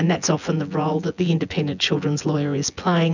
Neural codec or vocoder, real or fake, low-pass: vocoder, 24 kHz, 100 mel bands, Vocos; fake; 7.2 kHz